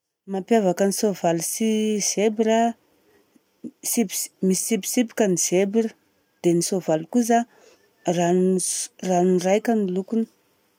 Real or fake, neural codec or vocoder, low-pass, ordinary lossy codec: real; none; 19.8 kHz; none